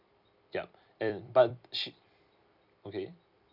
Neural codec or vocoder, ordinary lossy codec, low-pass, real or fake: vocoder, 44.1 kHz, 128 mel bands every 256 samples, BigVGAN v2; none; 5.4 kHz; fake